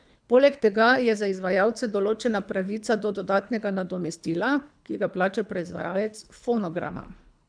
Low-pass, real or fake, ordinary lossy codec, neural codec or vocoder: 9.9 kHz; fake; none; codec, 24 kHz, 3 kbps, HILCodec